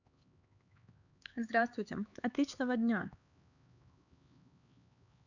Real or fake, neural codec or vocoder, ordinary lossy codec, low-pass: fake; codec, 16 kHz, 4 kbps, X-Codec, HuBERT features, trained on LibriSpeech; none; 7.2 kHz